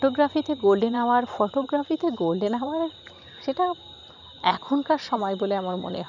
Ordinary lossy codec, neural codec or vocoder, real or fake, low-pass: none; vocoder, 44.1 kHz, 128 mel bands every 512 samples, BigVGAN v2; fake; 7.2 kHz